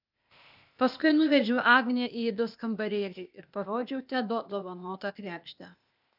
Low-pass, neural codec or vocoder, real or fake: 5.4 kHz; codec, 16 kHz, 0.8 kbps, ZipCodec; fake